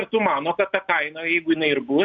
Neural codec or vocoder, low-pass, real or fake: none; 7.2 kHz; real